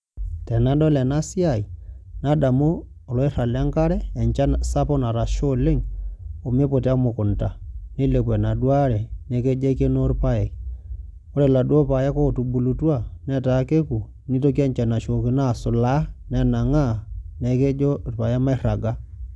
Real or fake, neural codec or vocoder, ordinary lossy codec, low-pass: real; none; none; none